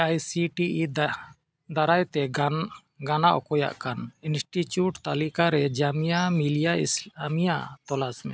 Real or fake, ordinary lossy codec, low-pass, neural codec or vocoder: real; none; none; none